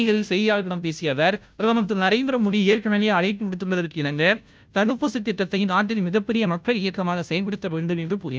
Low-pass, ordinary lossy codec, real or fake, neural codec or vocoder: none; none; fake; codec, 16 kHz, 0.5 kbps, FunCodec, trained on Chinese and English, 25 frames a second